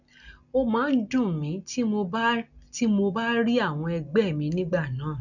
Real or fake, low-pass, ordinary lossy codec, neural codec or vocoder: real; 7.2 kHz; none; none